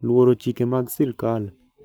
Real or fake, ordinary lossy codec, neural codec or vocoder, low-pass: fake; none; codec, 44.1 kHz, 7.8 kbps, Pupu-Codec; none